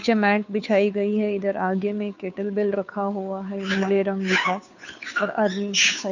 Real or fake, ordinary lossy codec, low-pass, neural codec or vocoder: fake; none; 7.2 kHz; codec, 16 kHz, 2 kbps, FunCodec, trained on Chinese and English, 25 frames a second